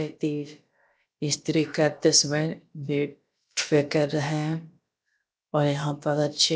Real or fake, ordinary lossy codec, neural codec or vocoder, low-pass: fake; none; codec, 16 kHz, about 1 kbps, DyCAST, with the encoder's durations; none